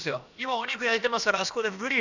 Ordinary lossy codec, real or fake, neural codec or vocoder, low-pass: none; fake; codec, 16 kHz, about 1 kbps, DyCAST, with the encoder's durations; 7.2 kHz